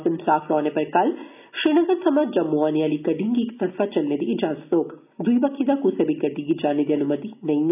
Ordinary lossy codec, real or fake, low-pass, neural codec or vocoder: none; real; 3.6 kHz; none